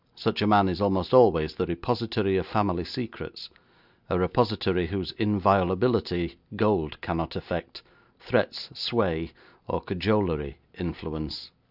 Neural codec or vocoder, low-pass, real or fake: none; 5.4 kHz; real